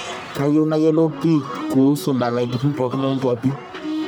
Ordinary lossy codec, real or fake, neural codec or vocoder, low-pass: none; fake; codec, 44.1 kHz, 1.7 kbps, Pupu-Codec; none